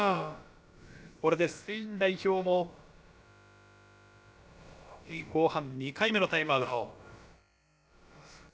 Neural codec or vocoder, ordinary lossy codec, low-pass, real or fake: codec, 16 kHz, about 1 kbps, DyCAST, with the encoder's durations; none; none; fake